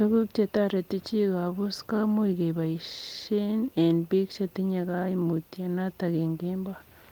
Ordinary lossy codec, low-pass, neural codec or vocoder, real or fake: Opus, 24 kbps; 19.8 kHz; none; real